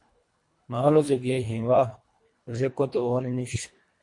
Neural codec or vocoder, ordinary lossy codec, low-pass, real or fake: codec, 24 kHz, 1.5 kbps, HILCodec; MP3, 48 kbps; 10.8 kHz; fake